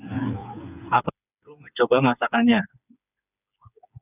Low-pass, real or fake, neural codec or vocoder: 3.6 kHz; fake; codec, 16 kHz, 4 kbps, FreqCodec, smaller model